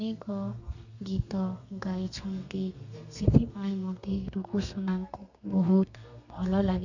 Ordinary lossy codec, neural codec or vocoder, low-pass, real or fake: none; codec, 32 kHz, 1.9 kbps, SNAC; 7.2 kHz; fake